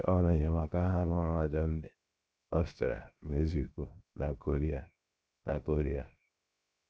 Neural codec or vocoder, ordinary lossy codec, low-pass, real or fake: codec, 16 kHz, 0.7 kbps, FocalCodec; none; none; fake